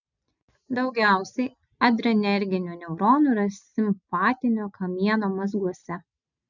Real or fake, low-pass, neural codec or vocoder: real; 7.2 kHz; none